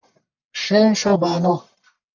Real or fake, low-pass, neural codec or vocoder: fake; 7.2 kHz; codec, 44.1 kHz, 1.7 kbps, Pupu-Codec